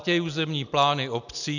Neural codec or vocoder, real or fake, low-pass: none; real; 7.2 kHz